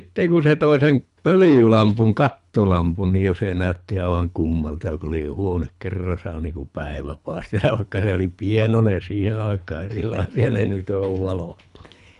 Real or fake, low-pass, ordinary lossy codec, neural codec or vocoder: fake; 10.8 kHz; none; codec, 24 kHz, 3 kbps, HILCodec